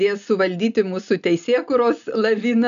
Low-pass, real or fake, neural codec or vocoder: 7.2 kHz; real; none